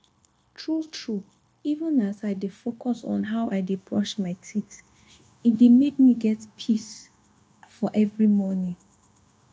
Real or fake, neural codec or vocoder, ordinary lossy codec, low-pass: fake; codec, 16 kHz, 0.9 kbps, LongCat-Audio-Codec; none; none